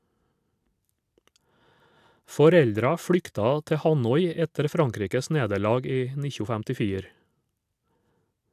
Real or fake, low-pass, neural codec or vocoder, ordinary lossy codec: real; 14.4 kHz; none; AAC, 96 kbps